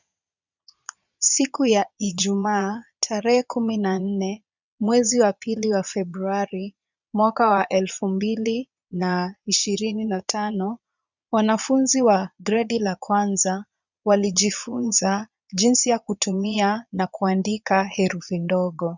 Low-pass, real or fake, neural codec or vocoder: 7.2 kHz; fake; vocoder, 22.05 kHz, 80 mel bands, Vocos